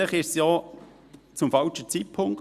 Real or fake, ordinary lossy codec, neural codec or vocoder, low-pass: real; Opus, 64 kbps; none; 14.4 kHz